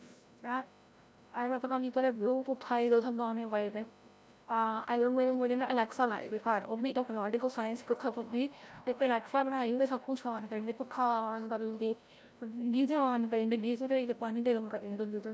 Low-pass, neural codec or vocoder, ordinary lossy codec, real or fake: none; codec, 16 kHz, 0.5 kbps, FreqCodec, larger model; none; fake